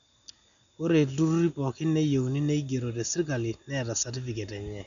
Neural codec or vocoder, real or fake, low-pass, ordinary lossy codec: none; real; 7.2 kHz; none